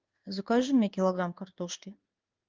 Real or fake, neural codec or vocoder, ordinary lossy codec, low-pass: fake; codec, 16 kHz in and 24 kHz out, 1 kbps, XY-Tokenizer; Opus, 24 kbps; 7.2 kHz